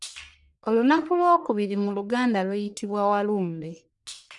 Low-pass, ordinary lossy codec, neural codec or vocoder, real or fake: 10.8 kHz; none; codec, 44.1 kHz, 1.7 kbps, Pupu-Codec; fake